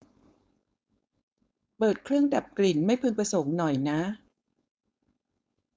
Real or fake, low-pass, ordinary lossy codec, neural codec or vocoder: fake; none; none; codec, 16 kHz, 4.8 kbps, FACodec